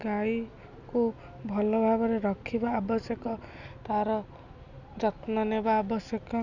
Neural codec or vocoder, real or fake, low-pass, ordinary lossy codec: none; real; 7.2 kHz; none